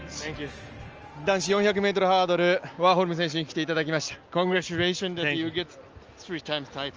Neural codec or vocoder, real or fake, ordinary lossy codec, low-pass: none; real; Opus, 24 kbps; 7.2 kHz